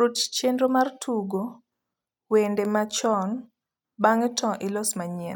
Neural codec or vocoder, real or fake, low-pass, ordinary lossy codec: none; real; 19.8 kHz; none